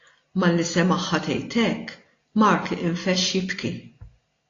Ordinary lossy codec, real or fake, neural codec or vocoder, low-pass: AAC, 32 kbps; real; none; 7.2 kHz